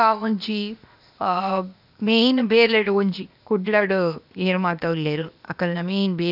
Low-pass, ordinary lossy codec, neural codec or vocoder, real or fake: 5.4 kHz; none; codec, 16 kHz, 0.8 kbps, ZipCodec; fake